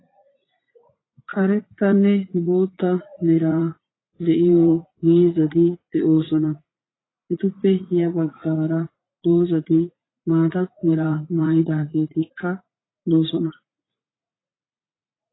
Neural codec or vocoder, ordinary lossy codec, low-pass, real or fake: vocoder, 44.1 kHz, 80 mel bands, Vocos; AAC, 16 kbps; 7.2 kHz; fake